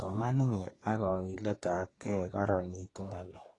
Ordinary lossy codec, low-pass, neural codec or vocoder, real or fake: AAC, 32 kbps; 10.8 kHz; codec, 24 kHz, 1 kbps, SNAC; fake